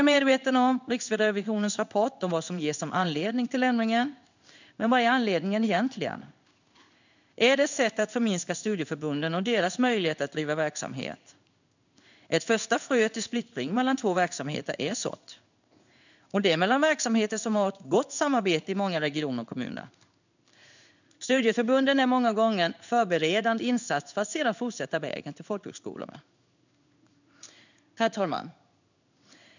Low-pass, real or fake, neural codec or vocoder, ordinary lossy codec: 7.2 kHz; fake; codec, 16 kHz in and 24 kHz out, 1 kbps, XY-Tokenizer; none